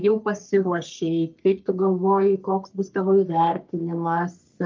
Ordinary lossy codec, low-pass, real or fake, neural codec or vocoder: Opus, 24 kbps; 7.2 kHz; fake; codec, 44.1 kHz, 3.4 kbps, Pupu-Codec